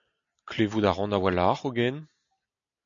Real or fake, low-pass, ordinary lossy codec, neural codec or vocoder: real; 7.2 kHz; MP3, 64 kbps; none